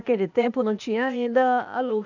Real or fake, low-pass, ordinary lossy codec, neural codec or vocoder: fake; 7.2 kHz; none; codec, 16 kHz, 0.8 kbps, ZipCodec